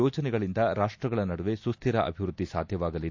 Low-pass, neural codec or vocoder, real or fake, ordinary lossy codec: 7.2 kHz; none; real; AAC, 48 kbps